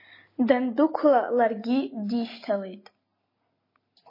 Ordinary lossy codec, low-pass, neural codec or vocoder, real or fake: MP3, 24 kbps; 5.4 kHz; none; real